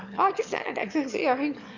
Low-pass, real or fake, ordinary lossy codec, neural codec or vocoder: 7.2 kHz; fake; none; autoencoder, 22.05 kHz, a latent of 192 numbers a frame, VITS, trained on one speaker